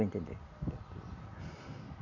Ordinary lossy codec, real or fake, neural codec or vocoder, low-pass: none; real; none; 7.2 kHz